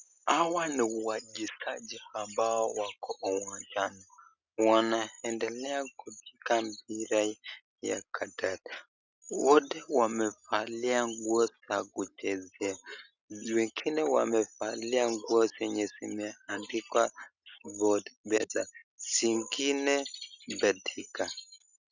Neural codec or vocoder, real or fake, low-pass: none; real; 7.2 kHz